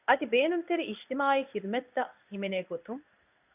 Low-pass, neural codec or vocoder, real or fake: 3.6 kHz; codec, 16 kHz in and 24 kHz out, 1 kbps, XY-Tokenizer; fake